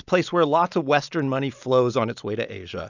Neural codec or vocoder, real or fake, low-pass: none; real; 7.2 kHz